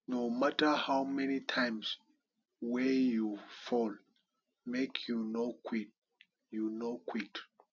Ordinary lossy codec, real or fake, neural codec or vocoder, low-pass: none; real; none; 7.2 kHz